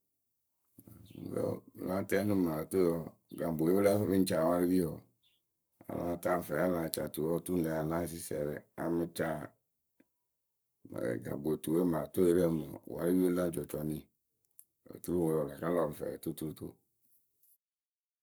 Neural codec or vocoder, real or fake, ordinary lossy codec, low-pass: codec, 44.1 kHz, 7.8 kbps, Pupu-Codec; fake; none; none